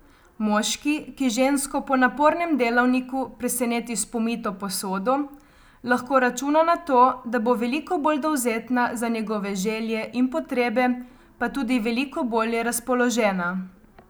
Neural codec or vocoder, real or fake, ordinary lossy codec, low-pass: none; real; none; none